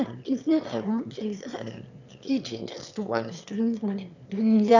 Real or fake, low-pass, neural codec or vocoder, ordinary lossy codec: fake; 7.2 kHz; autoencoder, 22.05 kHz, a latent of 192 numbers a frame, VITS, trained on one speaker; none